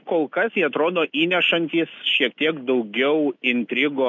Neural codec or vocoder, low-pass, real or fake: none; 7.2 kHz; real